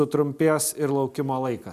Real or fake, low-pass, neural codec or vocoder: real; 14.4 kHz; none